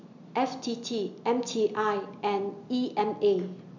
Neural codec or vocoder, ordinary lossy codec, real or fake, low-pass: none; none; real; 7.2 kHz